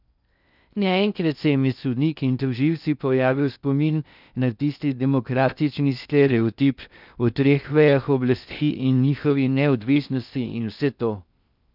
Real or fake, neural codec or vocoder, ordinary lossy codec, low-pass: fake; codec, 16 kHz in and 24 kHz out, 0.6 kbps, FocalCodec, streaming, 2048 codes; none; 5.4 kHz